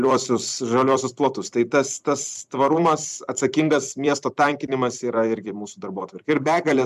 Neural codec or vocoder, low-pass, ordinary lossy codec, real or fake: vocoder, 44.1 kHz, 128 mel bands every 256 samples, BigVGAN v2; 14.4 kHz; MP3, 96 kbps; fake